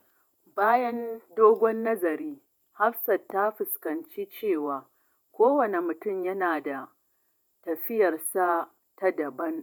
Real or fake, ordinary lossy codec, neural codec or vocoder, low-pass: fake; none; vocoder, 48 kHz, 128 mel bands, Vocos; 19.8 kHz